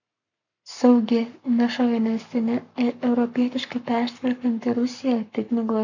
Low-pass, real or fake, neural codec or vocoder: 7.2 kHz; fake; codec, 44.1 kHz, 7.8 kbps, Pupu-Codec